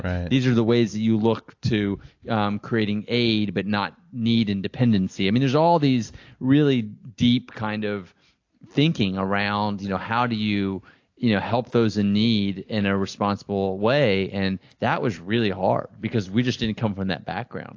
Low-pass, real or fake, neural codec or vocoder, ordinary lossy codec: 7.2 kHz; real; none; AAC, 48 kbps